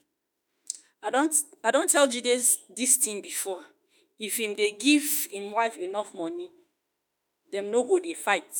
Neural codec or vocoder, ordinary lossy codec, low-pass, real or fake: autoencoder, 48 kHz, 32 numbers a frame, DAC-VAE, trained on Japanese speech; none; none; fake